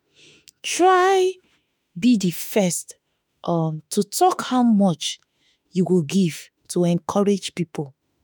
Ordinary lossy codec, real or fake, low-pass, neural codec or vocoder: none; fake; none; autoencoder, 48 kHz, 32 numbers a frame, DAC-VAE, trained on Japanese speech